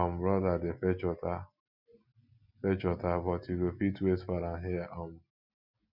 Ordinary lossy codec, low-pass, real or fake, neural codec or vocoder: none; 5.4 kHz; real; none